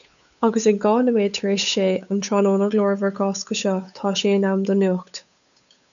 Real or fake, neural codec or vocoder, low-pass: fake; codec, 16 kHz, 8 kbps, FunCodec, trained on Chinese and English, 25 frames a second; 7.2 kHz